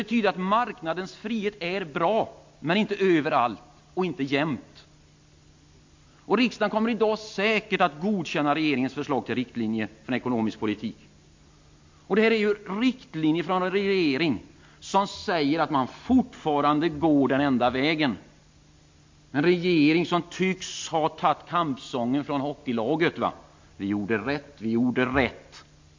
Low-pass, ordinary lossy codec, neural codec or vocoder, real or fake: 7.2 kHz; MP3, 48 kbps; none; real